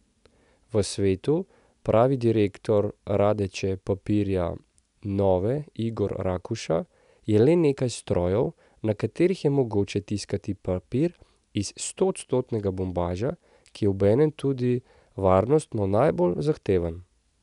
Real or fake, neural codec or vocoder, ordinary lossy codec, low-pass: real; none; none; 10.8 kHz